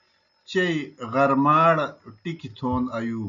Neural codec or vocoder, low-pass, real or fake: none; 7.2 kHz; real